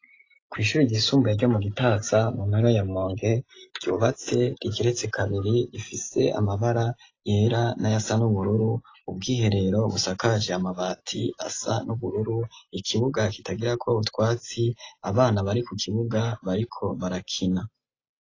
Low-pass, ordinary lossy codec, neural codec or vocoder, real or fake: 7.2 kHz; AAC, 32 kbps; none; real